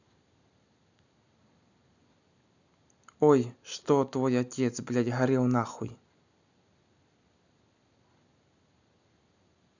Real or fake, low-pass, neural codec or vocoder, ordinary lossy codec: real; 7.2 kHz; none; none